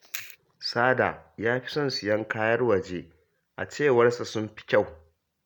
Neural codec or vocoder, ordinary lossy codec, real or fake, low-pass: none; none; real; 19.8 kHz